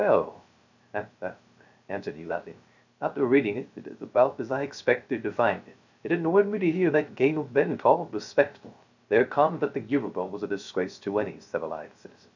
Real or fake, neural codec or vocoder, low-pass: fake; codec, 16 kHz, 0.3 kbps, FocalCodec; 7.2 kHz